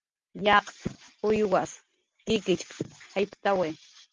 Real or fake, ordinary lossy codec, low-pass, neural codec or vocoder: real; Opus, 32 kbps; 7.2 kHz; none